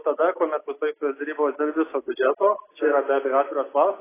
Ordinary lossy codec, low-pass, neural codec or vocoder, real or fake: AAC, 16 kbps; 3.6 kHz; none; real